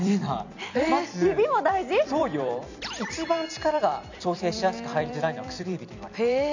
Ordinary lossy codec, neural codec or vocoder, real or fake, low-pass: none; vocoder, 44.1 kHz, 128 mel bands every 256 samples, BigVGAN v2; fake; 7.2 kHz